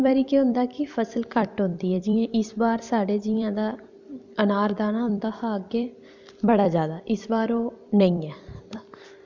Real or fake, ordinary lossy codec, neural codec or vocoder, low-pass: fake; none; vocoder, 44.1 kHz, 128 mel bands every 256 samples, BigVGAN v2; 7.2 kHz